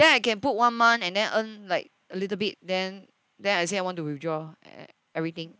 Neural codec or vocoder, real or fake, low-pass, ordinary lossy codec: none; real; none; none